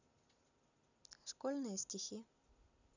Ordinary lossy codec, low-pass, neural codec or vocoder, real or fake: none; 7.2 kHz; none; real